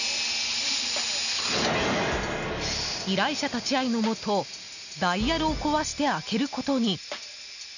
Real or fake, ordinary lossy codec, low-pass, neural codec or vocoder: real; none; 7.2 kHz; none